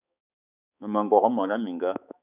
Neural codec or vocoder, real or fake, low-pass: codec, 16 kHz, 4 kbps, X-Codec, HuBERT features, trained on balanced general audio; fake; 3.6 kHz